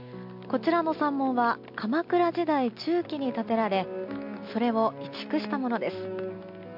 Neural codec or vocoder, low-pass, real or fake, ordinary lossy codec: none; 5.4 kHz; real; MP3, 48 kbps